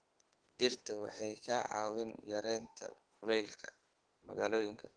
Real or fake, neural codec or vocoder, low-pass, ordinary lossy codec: fake; autoencoder, 48 kHz, 32 numbers a frame, DAC-VAE, trained on Japanese speech; 9.9 kHz; Opus, 16 kbps